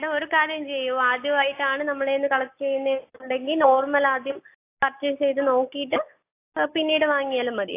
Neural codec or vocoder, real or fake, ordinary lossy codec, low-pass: none; real; AAC, 24 kbps; 3.6 kHz